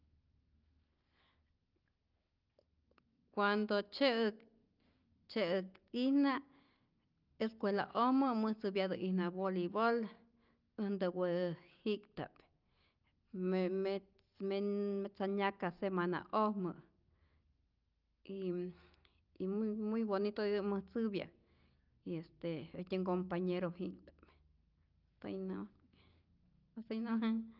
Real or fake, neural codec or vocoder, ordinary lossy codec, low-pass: real; none; Opus, 24 kbps; 5.4 kHz